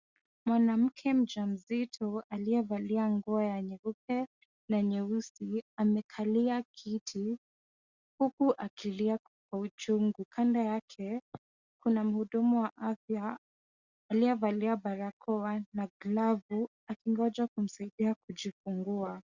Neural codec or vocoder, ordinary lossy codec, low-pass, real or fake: none; Opus, 64 kbps; 7.2 kHz; real